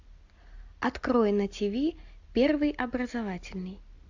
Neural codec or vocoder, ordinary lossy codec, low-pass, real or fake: none; AAC, 48 kbps; 7.2 kHz; real